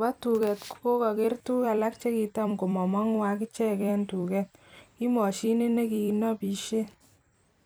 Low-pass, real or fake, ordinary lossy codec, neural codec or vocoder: none; fake; none; vocoder, 44.1 kHz, 128 mel bands every 256 samples, BigVGAN v2